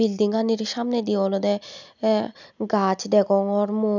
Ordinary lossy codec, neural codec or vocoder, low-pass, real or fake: none; none; 7.2 kHz; real